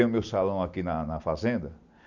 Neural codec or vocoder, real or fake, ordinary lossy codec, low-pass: none; real; none; 7.2 kHz